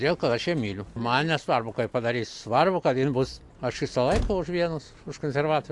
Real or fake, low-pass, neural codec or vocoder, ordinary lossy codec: real; 10.8 kHz; none; AAC, 64 kbps